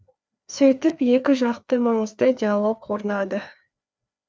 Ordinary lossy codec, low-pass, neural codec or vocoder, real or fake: none; none; codec, 16 kHz, 2 kbps, FreqCodec, larger model; fake